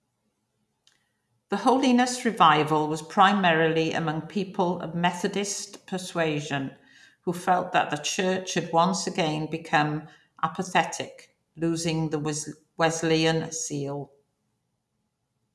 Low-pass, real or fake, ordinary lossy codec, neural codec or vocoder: none; real; none; none